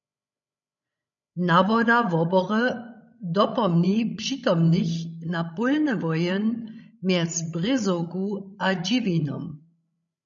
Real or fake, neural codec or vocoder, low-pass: fake; codec, 16 kHz, 16 kbps, FreqCodec, larger model; 7.2 kHz